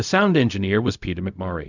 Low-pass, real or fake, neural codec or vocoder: 7.2 kHz; fake; codec, 16 kHz, 0.4 kbps, LongCat-Audio-Codec